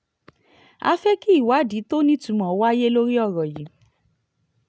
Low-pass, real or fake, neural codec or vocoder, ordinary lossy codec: none; real; none; none